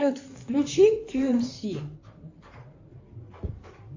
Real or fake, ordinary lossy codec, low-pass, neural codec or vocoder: fake; AAC, 48 kbps; 7.2 kHz; codec, 16 kHz in and 24 kHz out, 2.2 kbps, FireRedTTS-2 codec